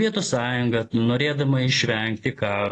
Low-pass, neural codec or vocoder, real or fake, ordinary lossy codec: 10.8 kHz; none; real; AAC, 32 kbps